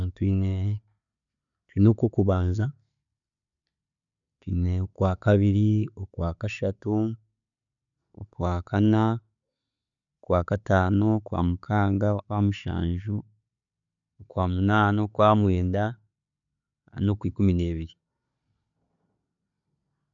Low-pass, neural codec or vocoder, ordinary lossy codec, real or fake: 7.2 kHz; none; none; real